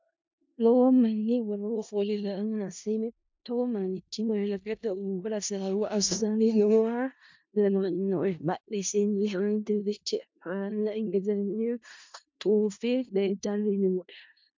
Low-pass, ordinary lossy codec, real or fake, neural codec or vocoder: 7.2 kHz; MP3, 64 kbps; fake; codec, 16 kHz in and 24 kHz out, 0.4 kbps, LongCat-Audio-Codec, four codebook decoder